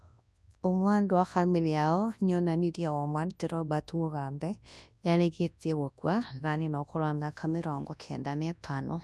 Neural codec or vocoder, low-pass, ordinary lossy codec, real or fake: codec, 24 kHz, 0.9 kbps, WavTokenizer, large speech release; none; none; fake